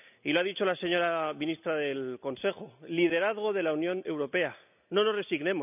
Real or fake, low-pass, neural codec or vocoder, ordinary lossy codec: real; 3.6 kHz; none; none